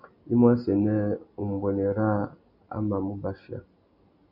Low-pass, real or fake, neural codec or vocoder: 5.4 kHz; real; none